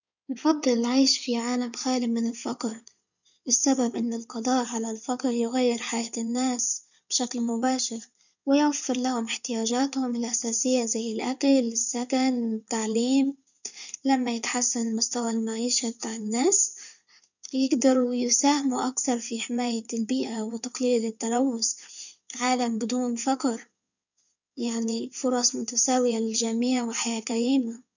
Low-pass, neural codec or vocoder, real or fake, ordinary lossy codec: 7.2 kHz; codec, 16 kHz in and 24 kHz out, 2.2 kbps, FireRedTTS-2 codec; fake; none